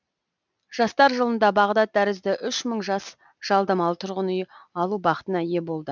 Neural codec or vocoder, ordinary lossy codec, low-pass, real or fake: none; none; 7.2 kHz; real